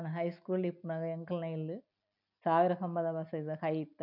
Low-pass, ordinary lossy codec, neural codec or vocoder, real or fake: 5.4 kHz; none; none; real